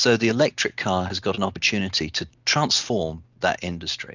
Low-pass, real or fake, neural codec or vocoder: 7.2 kHz; real; none